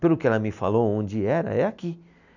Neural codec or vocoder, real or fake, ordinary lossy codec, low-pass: none; real; none; 7.2 kHz